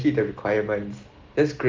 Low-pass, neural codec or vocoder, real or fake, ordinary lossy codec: 7.2 kHz; none; real; Opus, 16 kbps